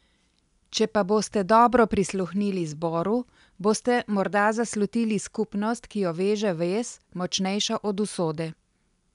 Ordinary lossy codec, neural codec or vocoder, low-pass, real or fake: none; none; 10.8 kHz; real